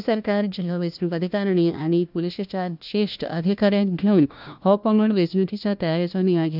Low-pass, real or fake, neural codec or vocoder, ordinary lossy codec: 5.4 kHz; fake; codec, 16 kHz, 1 kbps, FunCodec, trained on LibriTTS, 50 frames a second; none